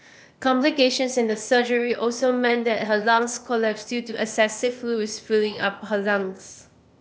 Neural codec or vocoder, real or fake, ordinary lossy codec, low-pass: codec, 16 kHz, 0.8 kbps, ZipCodec; fake; none; none